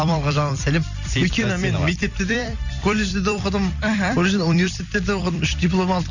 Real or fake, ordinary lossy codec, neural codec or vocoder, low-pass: fake; none; vocoder, 44.1 kHz, 128 mel bands every 256 samples, BigVGAN v2; 7.2 kHz